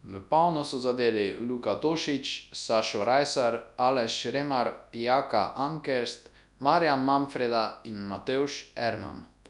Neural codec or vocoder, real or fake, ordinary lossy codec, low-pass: codec, 24 kHz, 0.9 kbps, WavTokenizer, large speech release; fake; none; 10.8 kHz